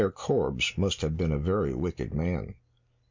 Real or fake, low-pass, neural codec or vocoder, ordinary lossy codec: real; 7.2 kHz; none; MP3, 64 kbps